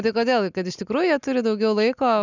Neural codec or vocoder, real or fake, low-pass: none; real; 7.2 kHz